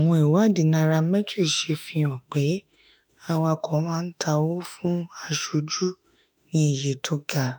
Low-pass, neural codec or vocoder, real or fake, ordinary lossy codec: none; autoencoder, 48 kHz, 32 numbers a frame, DAC-VAE, trained on Japanese speech; fake; none